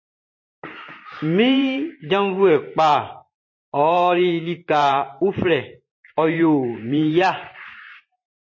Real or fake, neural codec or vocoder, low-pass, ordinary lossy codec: fake; vocoder, 44.1 kHz, 128 mel bands every 512 samples, BigVGAN v2; 7.2 kHz; MP3, 32 kbps